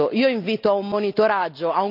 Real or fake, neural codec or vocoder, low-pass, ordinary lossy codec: real; none; 5.4 kHz; none